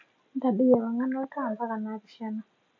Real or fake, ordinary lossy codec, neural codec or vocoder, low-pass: real; MP3, 48 kbps; none; 7.2 kHz